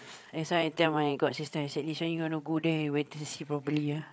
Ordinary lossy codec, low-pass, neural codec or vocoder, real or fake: none; none; codec, 16 kHz, 8 kbps, FreqCodec, larger model; fake